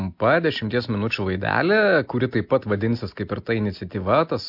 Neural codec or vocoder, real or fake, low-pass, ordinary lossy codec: none; real; 5.4 kHz; MP3, 48 kbps